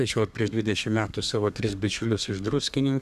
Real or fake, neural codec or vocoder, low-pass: fake; codec, 32 kHz, 1.9 kbps, SNAC; 14.4 kHz